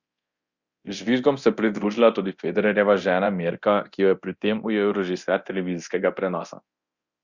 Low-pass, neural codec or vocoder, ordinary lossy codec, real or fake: 7.2 kHz; codec, 24 kHz, 0.9 kbps, DualCodec; Opus, 64 kbps; fake